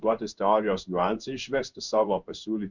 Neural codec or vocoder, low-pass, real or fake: codec, 16 kHz in and 24 kHz out, 1 kbps, XY-Tokenizer; 7.2 kHz; fake